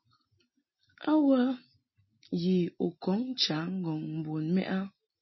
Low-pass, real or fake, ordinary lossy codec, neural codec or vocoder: 7.2 kHz; real; MP3, 24 kbps; none